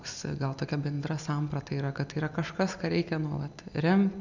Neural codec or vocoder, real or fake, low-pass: none; real; 7.2 kHz